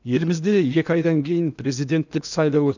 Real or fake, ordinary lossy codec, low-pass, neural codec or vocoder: fake; none; 7.2 kHz; codec, 16 kHz in and 24 kHz out, 0.8 kbps, FocalCodec, streaming, 65536 codes